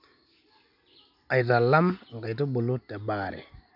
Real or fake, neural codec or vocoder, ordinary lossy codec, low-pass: real; none; none; 5.4 kHz